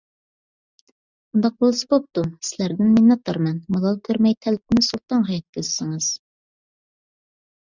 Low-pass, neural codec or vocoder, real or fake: 7.2 kHz; none; real